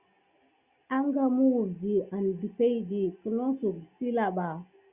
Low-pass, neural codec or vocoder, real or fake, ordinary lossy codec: 3.6 kHz; none; real; Opus, 64 kbps